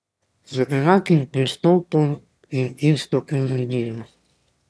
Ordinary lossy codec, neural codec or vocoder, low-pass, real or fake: none; autoencoder, 22.05 kHz, a latent of 192 numbers a frame, VITS, trained on one speaker; none; fake